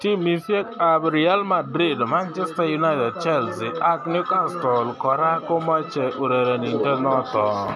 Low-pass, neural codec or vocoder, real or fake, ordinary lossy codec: none; none; real; none